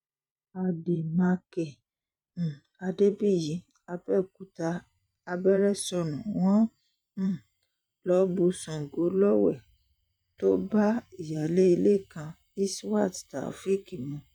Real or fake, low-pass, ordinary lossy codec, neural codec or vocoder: fake; 19.8 kHz; none; vocoder, 44.1 kHz, 128 mel bands every 512 samples, BigVGAN v2